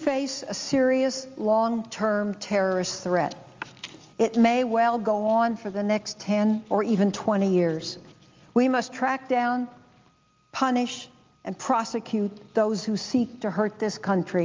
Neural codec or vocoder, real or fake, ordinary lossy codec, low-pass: none; real; Opus, 32 kbps; 7.2 kHz